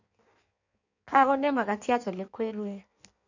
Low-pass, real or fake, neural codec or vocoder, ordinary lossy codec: 7.2 kHz; fake; codec, 16 kHz in and 24 kHz out, 1.1 kbps, FireRedTTS-2 codec; none